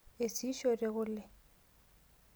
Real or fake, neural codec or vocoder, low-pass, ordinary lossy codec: real; none; none; none